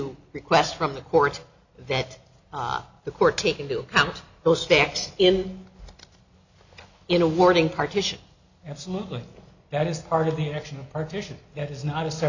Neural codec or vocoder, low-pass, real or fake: none; 7.2 kHz; real